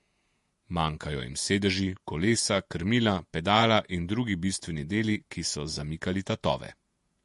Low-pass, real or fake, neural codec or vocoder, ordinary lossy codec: 14.4 kHz; fake; vocoder, 48 kHz, 128 mel bands, Vocos; MP3, 48 kbps